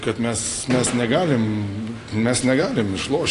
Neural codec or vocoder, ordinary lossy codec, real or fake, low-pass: none; AAC, 48 kbps; real; 10.8 kHz